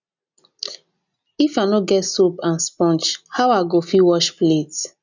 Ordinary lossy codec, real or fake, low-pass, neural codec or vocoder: none; real; 7.2 kHz; none